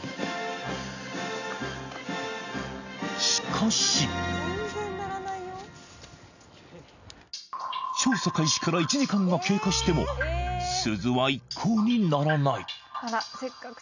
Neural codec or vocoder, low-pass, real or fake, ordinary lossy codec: none; 7.2 kHz; real; none